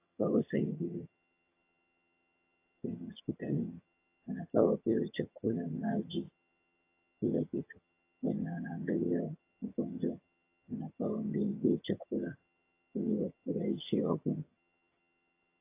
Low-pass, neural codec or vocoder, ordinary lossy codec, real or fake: 3.6 kHz; vocoder, 22.05 kHz, 80 mel bands, HiFi-GAN; AAC, 24 kbps; fake